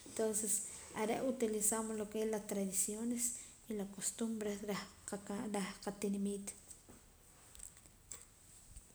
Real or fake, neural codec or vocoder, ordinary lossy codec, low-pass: real; none; none; none